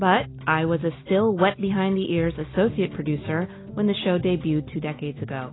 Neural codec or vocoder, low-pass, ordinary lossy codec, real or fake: none; 7.2 kHz; AAC, 16 kbps; real